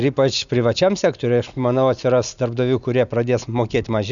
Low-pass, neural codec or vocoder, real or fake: 7.2 kHz; none; real